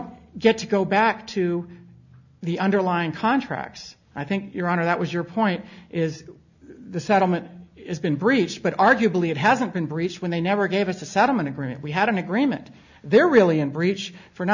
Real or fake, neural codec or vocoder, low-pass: real; none; 7.2 kHz